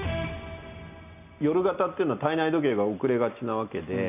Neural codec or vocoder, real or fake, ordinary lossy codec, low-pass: none; real; none; 3.6 kHz